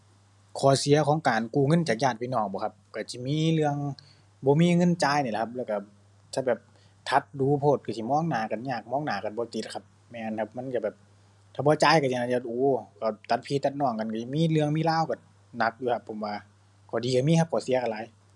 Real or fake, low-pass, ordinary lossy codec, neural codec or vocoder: real; none; none; none